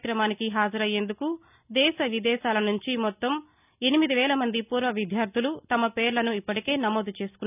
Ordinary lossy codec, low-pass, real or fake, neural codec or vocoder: none; 3.6 kHz; real; none